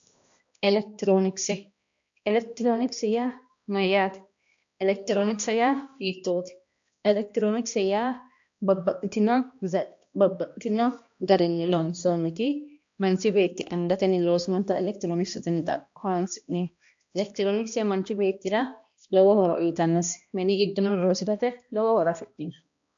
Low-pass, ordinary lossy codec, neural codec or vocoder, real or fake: 7.2 kHz; AAC, 64 kbps; codec, 16 kHz, 1 kbps, X-Codec, HuBERT features, trained on balanced general audio; fake